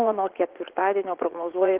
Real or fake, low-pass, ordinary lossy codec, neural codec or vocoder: fake; 3.6 kHz; Opus, 16 kbps; vocoder, 44.1 kHz, 80 mel bands, Vocos